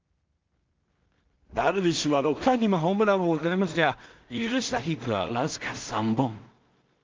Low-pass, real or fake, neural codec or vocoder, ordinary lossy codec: 7.2 kHz; fake; codec, 16 kHz in and 24 kHz out, 0.4 kbps, LongCat-Audio-Codec, two codebook decoder; Opus, 32 kbps